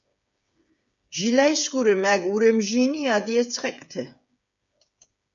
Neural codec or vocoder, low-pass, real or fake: codec, 16 kHz, 8 kbps, FreqCodec, smaller model; 7.2 kHz; fake